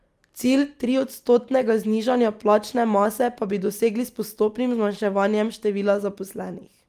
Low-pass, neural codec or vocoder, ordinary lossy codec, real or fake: 14.4 kHz; none; Opus, 32 kbps; real